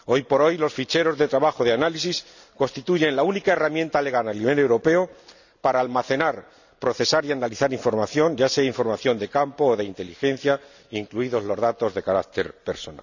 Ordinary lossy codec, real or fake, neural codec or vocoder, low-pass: none; real; none; 7.2 kHz